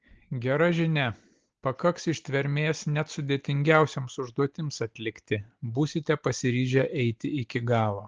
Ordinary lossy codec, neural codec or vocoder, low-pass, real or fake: Opus, 16 kbps; none; 7.2 kHz; real